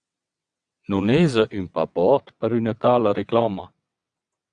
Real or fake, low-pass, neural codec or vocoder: fake; 9.9 kHz; vocoder, 22.05 kHz, 80 mel bands, WaveNeXt